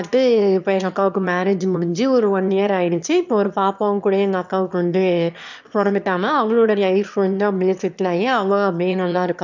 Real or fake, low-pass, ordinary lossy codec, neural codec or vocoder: fake; 7.2 kHz; none; autoencoder, 22.05 kHz, a latent of 192 numbers a frame, VITS, trained on one speaker